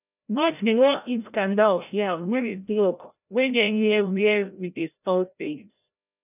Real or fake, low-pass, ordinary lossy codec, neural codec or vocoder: fake; 3.6 kHz; none; codec, 16 kHz, 0.5 kbps, FreqCodec, larger model